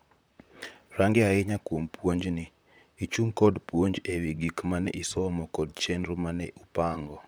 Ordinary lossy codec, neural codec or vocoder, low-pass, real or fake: none; vocoder, 44.1 kHz, 128 mel bands, Pupu-Vocoder; none; fake